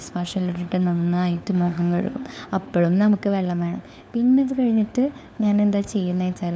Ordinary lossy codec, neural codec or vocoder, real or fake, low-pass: none; codec, 16 kHz, 4 kbps, FunCodec, trained on LibriTTS, 50 frames a second; fake; none